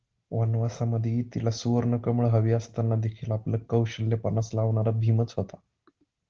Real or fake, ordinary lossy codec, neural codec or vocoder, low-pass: real; Opus, 16 kbps; none; 7.2 kHz